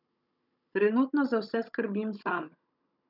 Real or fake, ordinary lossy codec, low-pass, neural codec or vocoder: fake; none; 5.4 kHz; codec, 16 kHz, 16 kbps, FunCodec, trained on Chinese and English, 50 frames a second